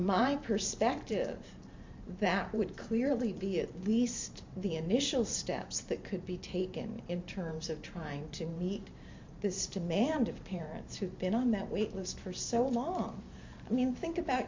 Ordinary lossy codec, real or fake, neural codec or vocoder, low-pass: MP3, 48 kbps; fake; vocoder, 44.1 kHz, 128 mel bands every 512 samples, BigVGAN v2; 7.2 kHz